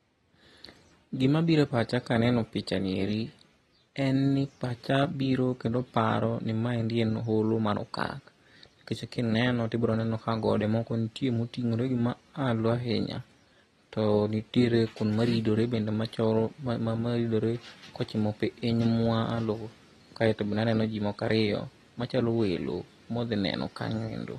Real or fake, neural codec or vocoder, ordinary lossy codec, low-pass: real; none; AAC, 32 kbps; 14.4 kHz